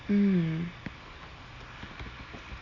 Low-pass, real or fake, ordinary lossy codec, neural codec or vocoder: 7.2 kHz; real; none; none